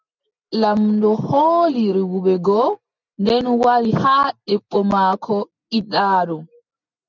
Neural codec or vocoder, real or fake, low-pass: none; real; 7.2 kHz